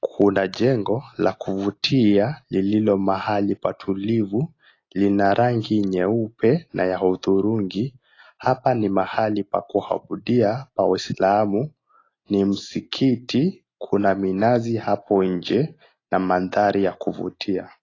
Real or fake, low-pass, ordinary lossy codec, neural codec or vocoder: real; 7.2 kHz; AAC, 32 kbps; none